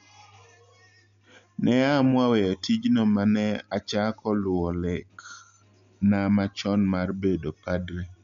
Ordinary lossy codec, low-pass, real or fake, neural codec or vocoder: MP3, 64 kbps; 7.2 kHz; real; none